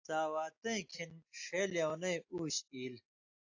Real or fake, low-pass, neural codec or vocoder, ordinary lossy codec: real; 7.2 kHz; none; AAC, 48 kbps